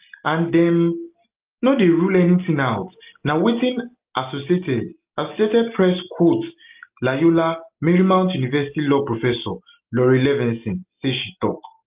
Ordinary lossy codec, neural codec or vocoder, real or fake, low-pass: Opus, 32 kbps; none; real; 3.6 kHz